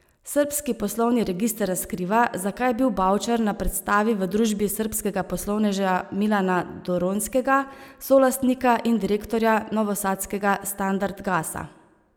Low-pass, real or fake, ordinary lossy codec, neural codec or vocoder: none; real; none; none